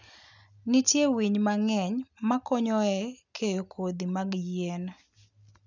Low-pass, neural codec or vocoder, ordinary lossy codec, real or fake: 7.2 kHz; none; none; real